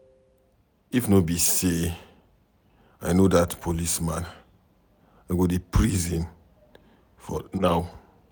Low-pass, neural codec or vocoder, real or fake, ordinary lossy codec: none; none; real; none